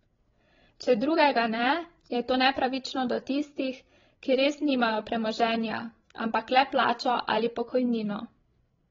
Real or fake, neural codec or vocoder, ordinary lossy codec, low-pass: fake; codec, 16 kHz, 16 kbps, FreqCodec, larger model; AAC, 24 kbps; 7.2 kHz